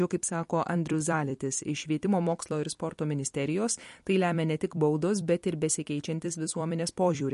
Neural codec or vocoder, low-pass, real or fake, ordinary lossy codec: vocoder, 44.1 kHz, 128 mel bands every 256 samples, BigVGAN v2; 14.4 kHz; fake; MP3, 48 kbps